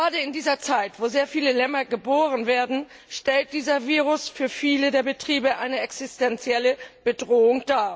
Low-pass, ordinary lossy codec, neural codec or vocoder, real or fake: none; none; none; real